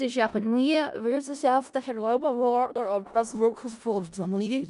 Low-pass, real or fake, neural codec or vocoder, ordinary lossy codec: 10.8 kHz; fake; codec, 16 kHz in and 24 kHz out, 0.4 kbps, LongCat-Audio-Codec, four codebook decoder; AAC, 96 kbps